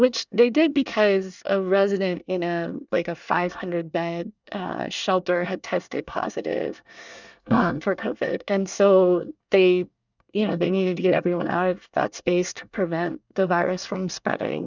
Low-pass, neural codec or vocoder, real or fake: 7.2 kHz; codec, 24 kHz, 1 kbps, SNAC; fake